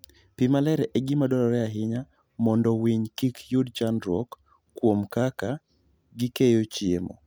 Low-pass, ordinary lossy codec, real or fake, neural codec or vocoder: none; none; real; none